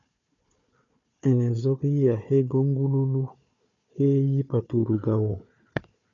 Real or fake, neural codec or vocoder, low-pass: fake; codec, 16 kHz, 4 kbps, FunCodec, trained on Chinese and English, 50 frames a second; 7.2 kHz